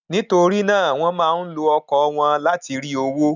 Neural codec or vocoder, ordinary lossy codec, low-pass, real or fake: none; none; 7.2 kHz; real